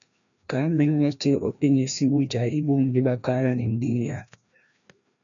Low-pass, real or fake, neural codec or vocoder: 7.2 kHz; fake; codec, 16 kHz, 1 kbps, FreqCodec, larger model